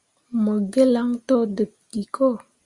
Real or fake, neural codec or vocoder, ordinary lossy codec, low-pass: real; none; Opus, 64 kbps; 10.8 kHz